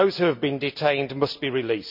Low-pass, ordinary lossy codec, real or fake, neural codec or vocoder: 5.4 kHz; none; real; none